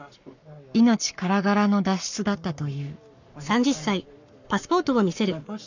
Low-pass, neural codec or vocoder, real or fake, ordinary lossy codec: 7.2 kHz; codec, 44.1 kHz, 7.8 kbps, Pupu-Codec; fake; none